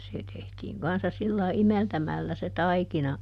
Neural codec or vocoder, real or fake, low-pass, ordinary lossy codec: none; real; 14.4 kHz; none